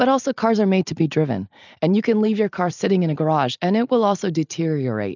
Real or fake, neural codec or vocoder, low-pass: real; none; 7.2 kHz